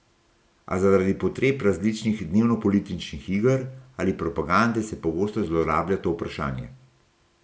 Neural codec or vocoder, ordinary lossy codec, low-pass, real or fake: none; none; none; real